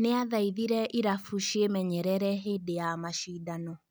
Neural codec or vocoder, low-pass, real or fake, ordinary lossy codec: none; none; real; none